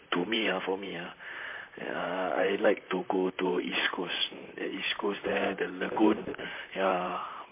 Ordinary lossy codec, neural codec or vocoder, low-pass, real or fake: MP3, 24 kbps; vocoder, 44.1 kHz, 128 mel bands, Pupu-Vocoder; 3.6 kHz; fake